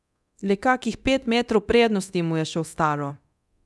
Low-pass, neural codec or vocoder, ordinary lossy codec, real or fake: none; codec, 24 kHz, 0.9 kbps, DualCodec; none; fake